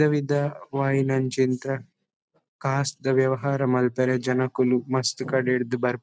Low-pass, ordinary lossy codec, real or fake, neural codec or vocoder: none; none; real; none